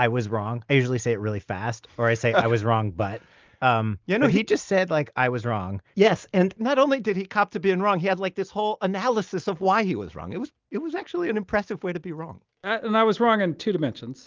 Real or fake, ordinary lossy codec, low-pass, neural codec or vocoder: real; Opus, 24 kbps; 7.2 kHz; none